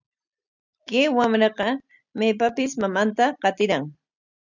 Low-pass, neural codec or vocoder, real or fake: 7.2 kHz; none; real